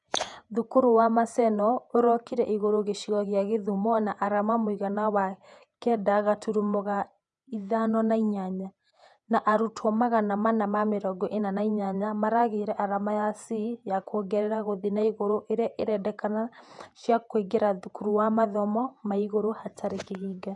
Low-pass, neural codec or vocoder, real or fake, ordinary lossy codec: 10.8 kHz; vocoder, 48 kHz, 128 mel bands, Vocos; fake; none